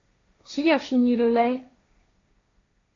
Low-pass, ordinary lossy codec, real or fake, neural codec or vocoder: 7.2 kHz; AAC, 32 kbps; fake; codec, 16 kHz, 1.1 kbps, Voila-Tokenizer